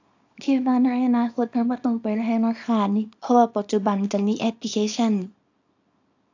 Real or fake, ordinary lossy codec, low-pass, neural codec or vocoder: fake; AAC, 48 kbps; 7.2 kHz; codec, 24 kHz, 0.9 kbps, WavTokenizer, small release